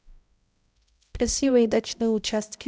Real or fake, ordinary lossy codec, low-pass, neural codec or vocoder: fake; none; none; codec, 16 kHz, 0.5 kbps, X-Codec, HuBERT features, trained on balanced general audio